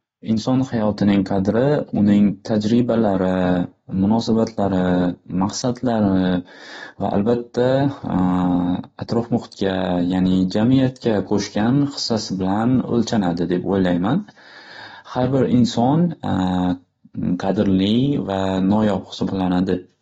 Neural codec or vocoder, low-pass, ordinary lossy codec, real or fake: none; 19.8 kHz; AAC, 24 kbps; real